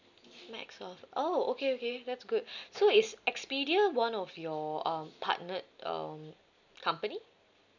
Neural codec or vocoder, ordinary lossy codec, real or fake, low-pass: none; none; real; 7.2 kHz